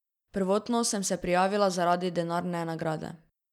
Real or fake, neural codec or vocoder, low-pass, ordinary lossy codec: real; none; 19.8 kHz; none